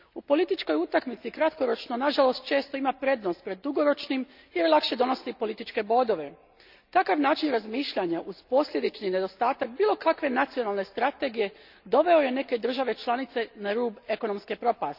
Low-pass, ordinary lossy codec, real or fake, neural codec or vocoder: 5.4 kHz; none; real; none